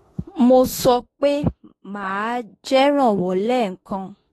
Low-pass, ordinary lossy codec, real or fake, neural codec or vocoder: 19.8 kHz; AAC, 32 kbps; fake; autoencoder, 48 kHz, 32 numbers a frame, DAC-VAE, trained on Japanese speech